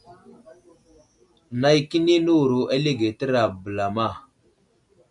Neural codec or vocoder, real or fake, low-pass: none; real; 10.8 kHz